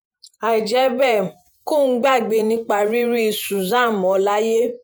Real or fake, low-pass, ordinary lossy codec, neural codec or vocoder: fake; none; none; vocoder, 48 kHz, 128 mel bands, Vocos